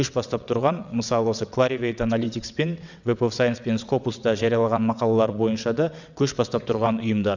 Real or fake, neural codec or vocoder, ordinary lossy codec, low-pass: fake; vocoder, 22.05 kHz, 80 mel bands, WaveNeXt; none; 7.2 kHz